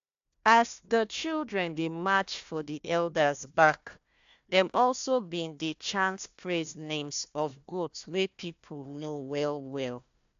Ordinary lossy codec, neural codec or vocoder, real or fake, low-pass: AAC, 64 kbps; codec, 16 kHz, 1 kbps, FunCodec, trained on Chinese and English, 50 frames a second; fake; 7.2 kHz